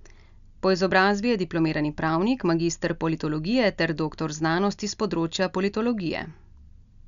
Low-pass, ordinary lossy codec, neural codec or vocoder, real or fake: 7.2 kHz; none; none; real